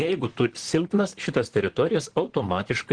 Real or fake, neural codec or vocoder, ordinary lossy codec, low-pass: fake; vocoder, 44.1 kHz, 128 mel bands, Pupu-Vocoder; Opus, 16 kbps; 9.9 kHz